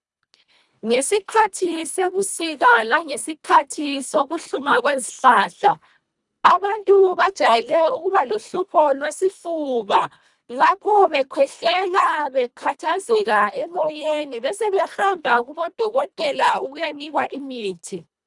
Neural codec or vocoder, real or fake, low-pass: codec, 24 kHz, 1.5 kbps, HILCodec; fake; 10.8 kHz